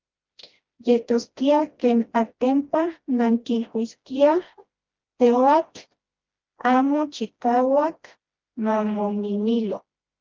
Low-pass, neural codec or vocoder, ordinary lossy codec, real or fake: 7.2 kHz; codec, 16 kHz, 1 kbps, FreqCodec, smaller model; Opus, 24 kbps; fake